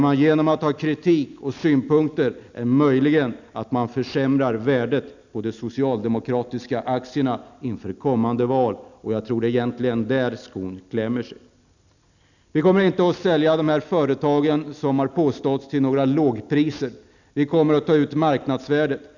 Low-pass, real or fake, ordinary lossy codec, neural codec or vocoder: 7.2 kHz; real; none; none